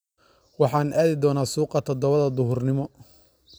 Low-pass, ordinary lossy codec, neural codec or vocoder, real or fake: none; none; none; real